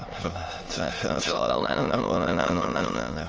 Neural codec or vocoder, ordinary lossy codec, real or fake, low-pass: autoencoder, 22.05 kHz, a latent of 192 numbers a frame, VITS, trained on many speakers; Opus, 24 kbps; fake; 7.2 kHz